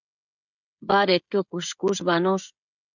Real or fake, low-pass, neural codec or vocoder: fake; 7.2 kHz; codec, 16 kHz, 16 kbps, FreqCodec, smaller model